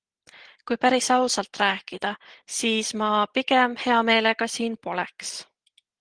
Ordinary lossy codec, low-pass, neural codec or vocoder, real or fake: Opus, 16 kbps; 9.9 kHz; none; real